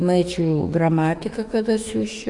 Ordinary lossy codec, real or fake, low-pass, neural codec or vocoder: AAC, 64 kbps; fake; 10.8 kHz; autoencoder, 48 kHz, 32 numbers a frame, DAC-VAE, trained on Japanese speech